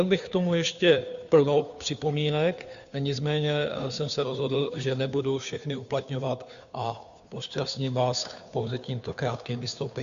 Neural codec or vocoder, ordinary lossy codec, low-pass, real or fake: codec, 16 kHz, 2 kbps, FunCodec, trained on Chinese and English, 25 frames a second; AAC, 96 kbps; 7.2 kHz; fake